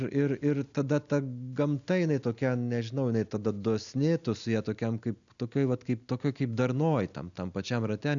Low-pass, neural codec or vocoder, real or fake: 7.2 kHz; none; real